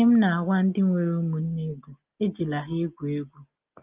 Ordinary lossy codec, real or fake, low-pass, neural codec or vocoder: Opus, 24 kbps; real; 3.6 kHz; none